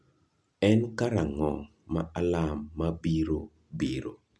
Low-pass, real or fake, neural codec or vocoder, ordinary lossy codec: none; real; none; none